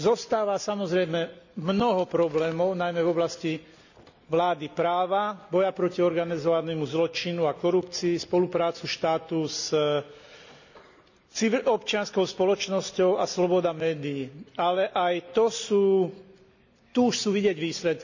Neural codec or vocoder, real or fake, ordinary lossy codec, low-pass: none; real; none; 7.2 kHz